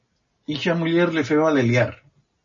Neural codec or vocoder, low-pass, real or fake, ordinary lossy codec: none; 7.2 kHz; real; MP3, 32 kbps